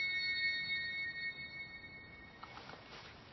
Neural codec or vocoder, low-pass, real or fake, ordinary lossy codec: none; 7.2 kHz; real; MP3, 24 kbps